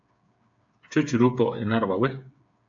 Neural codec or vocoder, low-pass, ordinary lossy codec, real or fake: codec, 16 kHz, 8 kbps, FreqCodec, smaller model; 7.2 kHz; AAC, 64 kbps; fake